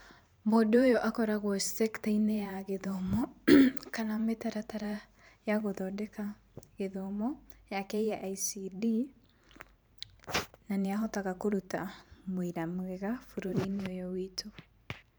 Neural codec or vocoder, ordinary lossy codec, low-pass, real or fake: vocoder, 44.1 kHz, 128 mel bands every 512 samples, BigVGAN v2; none; none; fake